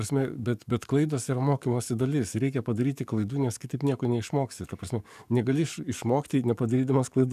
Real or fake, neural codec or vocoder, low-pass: fake; codec, 44.1 kHz, 7.8 kbps, DAC; 14.4 kHz